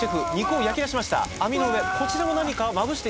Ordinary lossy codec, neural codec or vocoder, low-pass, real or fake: none; none; none; real